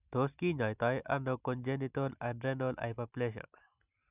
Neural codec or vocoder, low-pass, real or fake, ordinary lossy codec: none; 3.6 kHz; real; none